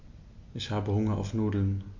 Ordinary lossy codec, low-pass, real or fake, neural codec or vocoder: MP3, 48 kbps; 7.2 kHz; real; none